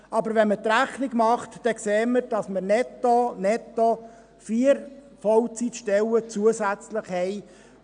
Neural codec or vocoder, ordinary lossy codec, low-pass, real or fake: none; none; 9.9 kHz; real